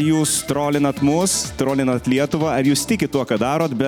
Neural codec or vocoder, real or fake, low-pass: none; real; 19.8 kHz